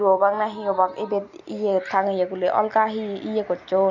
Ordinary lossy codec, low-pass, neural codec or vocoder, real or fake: none; 7.2 kHz; none; real